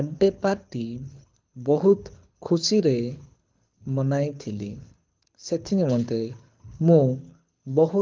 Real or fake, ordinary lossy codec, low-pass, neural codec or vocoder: fake; Opus, 24 kbps; 7.2 kHz; codec, 24 kHz, 6 kbps, HILCodec